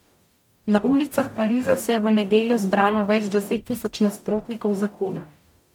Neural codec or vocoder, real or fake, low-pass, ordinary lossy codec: codec, 44.1 kHz, 0.9 kbps, DAC; fake; 19.8 kHz; MP3, 96 kbps